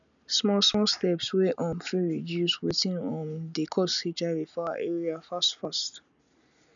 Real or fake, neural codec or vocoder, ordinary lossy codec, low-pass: real; none; none; 7.2 kHz